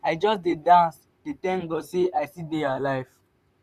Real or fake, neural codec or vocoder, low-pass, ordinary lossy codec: fake; vocoder, 44.1 kHz, 128 mel bands, Pupu-Vocoder; 14.4 kHz; none